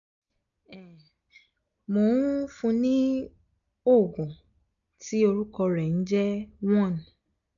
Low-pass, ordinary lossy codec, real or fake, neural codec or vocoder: 7.2 kHz; MP3, 96 kbps; real; none